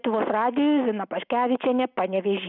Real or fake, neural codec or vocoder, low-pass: real; none; 5.4 kHz